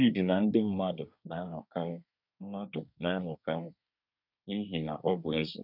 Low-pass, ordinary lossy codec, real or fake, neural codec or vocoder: 5.4 kHz; none; fake; codec, 24 kHz, 1 kbps, SNAC